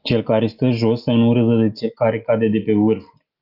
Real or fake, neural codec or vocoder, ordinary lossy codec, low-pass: real; none; Opus, 24 kbps; 5.4 kHz